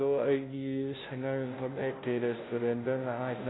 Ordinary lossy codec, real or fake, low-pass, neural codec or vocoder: AAC, 16 kbps; fake; 7.2 kHz; codec, 16 kHz, 0.5 kbps, FunCodec, trained on Chinese and English, 25 frames a second